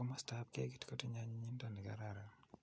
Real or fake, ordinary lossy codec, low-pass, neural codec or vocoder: real; none; none; none